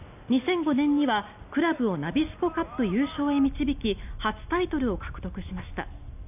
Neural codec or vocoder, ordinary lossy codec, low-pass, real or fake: none; none; 3.6 kHz; real